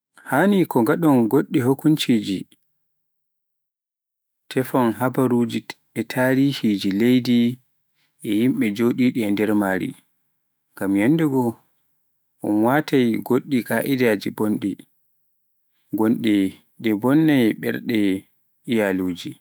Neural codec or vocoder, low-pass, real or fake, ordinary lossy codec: autoencoder, 48 kHz, 128 numbers a frame, DAC-VAE, trained on Japanese speech; none; fake; none